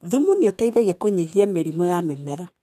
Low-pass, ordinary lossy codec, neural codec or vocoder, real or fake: 14.4 kHz; none; codec, 32 kHz, 1.9 kbps, SNAC; fake